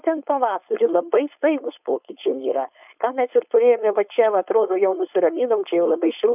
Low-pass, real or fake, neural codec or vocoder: 3.6 kHz; fake; codec, 16 kHz, 4.8 kbps, FACodec